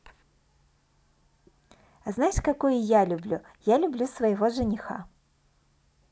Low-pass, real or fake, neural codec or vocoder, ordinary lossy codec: none; real; none; none